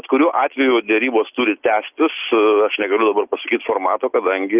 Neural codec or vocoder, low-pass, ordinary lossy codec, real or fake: none; 3.6 kHz; Opus, 24 kbps; real